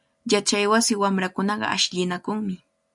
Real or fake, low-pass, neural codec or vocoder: real; 10.8 kHz; none